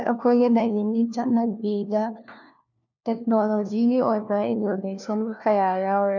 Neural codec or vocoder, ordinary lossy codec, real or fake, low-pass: codec, 16 kHz, 1 kbps, FunCodec, trained on LibriTTS, 50 frames a second; none; fake; 7.2 kHz